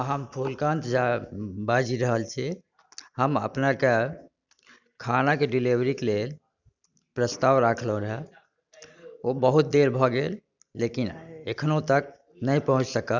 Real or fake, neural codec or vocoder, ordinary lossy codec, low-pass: real; none; none; 7.2 kHz